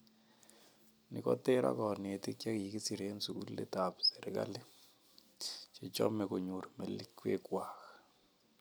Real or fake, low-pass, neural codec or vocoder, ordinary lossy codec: real; none; none; none